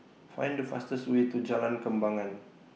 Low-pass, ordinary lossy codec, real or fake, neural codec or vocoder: none; none; real; none